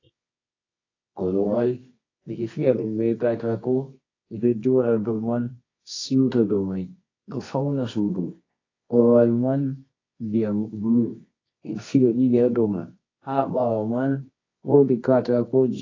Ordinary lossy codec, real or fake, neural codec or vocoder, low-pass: AAC, 32 kbps; fake; codec, 24 kHz, 0.9 kbps, WavTokenizer, medium music audio release; 7.2 kHz